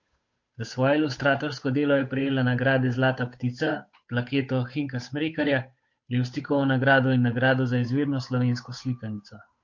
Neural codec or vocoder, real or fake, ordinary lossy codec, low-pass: codec, 16 kHz, 8 kbps, FunCodec, trained on Chinese and English, 25 frames a second; fake; MP3, 48 kbps; 7.2 kHz